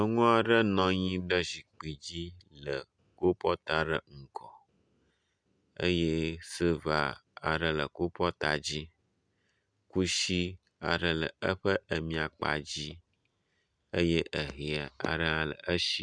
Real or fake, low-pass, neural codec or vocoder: real; 9.9 kHz; none